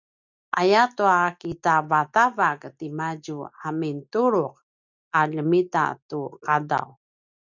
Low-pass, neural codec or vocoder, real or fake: 7.2 kHz; none; real